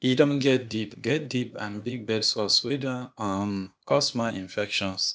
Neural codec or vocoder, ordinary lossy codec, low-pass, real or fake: codec, 16 kHz, 0.8 kbps, ZipCodec; none; none; fake